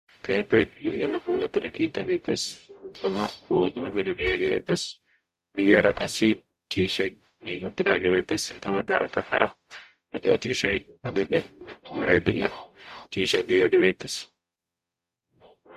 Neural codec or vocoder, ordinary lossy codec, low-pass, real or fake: codec, 44.1 kHz, 0.9 kbps, DAC; Opus, 64 kbps; 14.4 kHz; fake